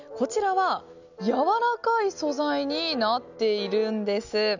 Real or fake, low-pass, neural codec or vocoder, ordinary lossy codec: real; 7.2 kHz; none; none